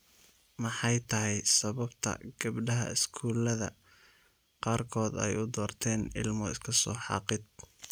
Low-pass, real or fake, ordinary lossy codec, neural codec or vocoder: none; real; none; none